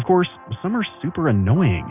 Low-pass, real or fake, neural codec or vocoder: 3.6 kHz; real; none